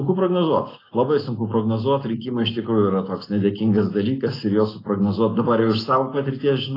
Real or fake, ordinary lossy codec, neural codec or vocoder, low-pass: real; AAC, 24 kbps; none; 5.4 kHz